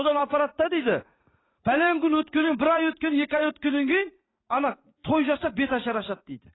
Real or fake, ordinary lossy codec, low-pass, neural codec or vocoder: fake; AAC, 16 kbps; 7.2 kHz; vocoder, 44.1 kHz, 80 mel bands, Vocos